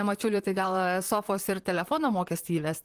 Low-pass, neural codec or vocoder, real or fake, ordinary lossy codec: 14.4 kHz; vocoder, 44.1 kHz, 128 mel bands, Pupu-Vocoder; fake; Opus, 24 kbps